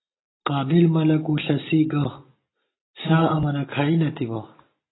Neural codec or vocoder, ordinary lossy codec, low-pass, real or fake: none; AAC, 16 kbps; 7.2 kHz; real